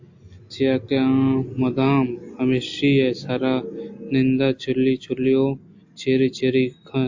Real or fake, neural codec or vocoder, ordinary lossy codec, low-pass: real; none; AAC, 48 kbps; 7.2 kHz